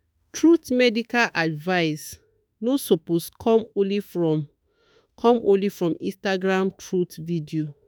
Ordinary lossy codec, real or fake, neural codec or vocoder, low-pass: none; fake; autoencoder, 48 kHz, 32 numbers a frame, DAC-VAE, trained on Japanese speech; none